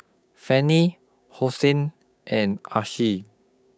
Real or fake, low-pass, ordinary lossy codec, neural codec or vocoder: fake; none; none; codec, 16 kHz, 6 kbps, DAC